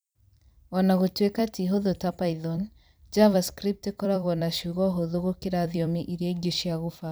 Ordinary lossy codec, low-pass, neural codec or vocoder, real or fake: none; none; vocoder, 44.1 kHz, 128 mel bands every 256 samples, BigVGAN v2; fake